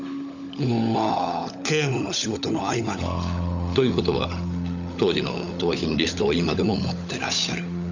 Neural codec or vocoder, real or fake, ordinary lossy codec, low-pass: codec, 16 kHz, 16 kbps, FunCodec, trained on LibriTTS, 50 frames a second; fake; none; 7.2 kHz